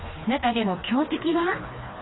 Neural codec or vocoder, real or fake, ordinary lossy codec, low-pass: codec, 16 kHz, 2 kbps, FreqCodec, smaller model; fake; AAC, 16 kbps; 7.2 kHz